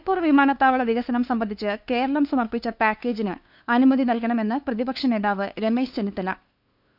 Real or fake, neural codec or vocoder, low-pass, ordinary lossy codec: fake; codec, 16 kHz, 2 kbps, FunCodec, trained on LibriTTS, 25 frames a second; 5.4 kHz; none